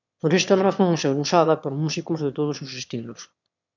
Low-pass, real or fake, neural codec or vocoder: 7.2 kHz; fake; autoencoder, 22.05 kHz, a latent of 192 numbers a frame, VITS, trained on one speaker